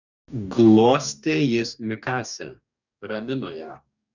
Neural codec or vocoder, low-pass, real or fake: codec, 44.1 kHz, 2.6 kbps, DAC; 7.2 kHz; fake